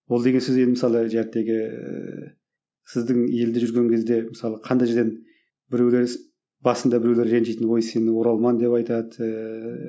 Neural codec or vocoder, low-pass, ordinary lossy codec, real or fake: none; none; none; real